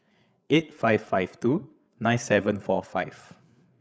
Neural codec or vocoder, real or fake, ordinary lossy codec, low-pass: codec, 16 kHz, 8 kbps, FreqCodec, larger model; fake; none; none